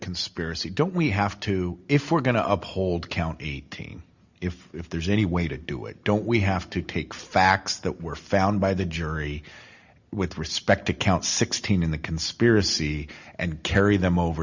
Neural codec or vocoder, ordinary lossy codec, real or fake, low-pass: none; Opus, 64 kbps; real; 7.2 kHz